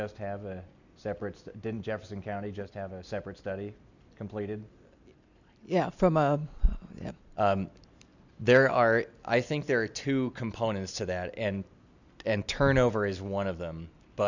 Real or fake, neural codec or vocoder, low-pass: real; none; 7.2 kHz